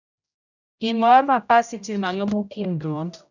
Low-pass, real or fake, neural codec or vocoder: 7.2 kHz; fake; codec, 16 kHz, 0.5 kbps, X-Codec, HuBERT features, trained on general audio